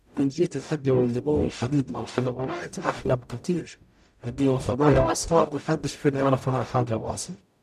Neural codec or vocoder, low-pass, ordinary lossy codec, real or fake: codec, 44.1 kHz, 0.9 kbps, DAC; 14.4 kHz; none; fake